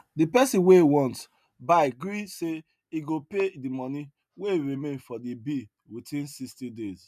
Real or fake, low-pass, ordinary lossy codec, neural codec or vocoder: real; 14.4 kHz; none; none